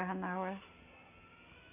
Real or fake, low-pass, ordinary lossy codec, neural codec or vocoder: real; 3.6 kHz; AAC, 24 kbps; none